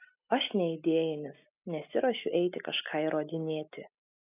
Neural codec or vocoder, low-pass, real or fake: none; 3.6 kHz; real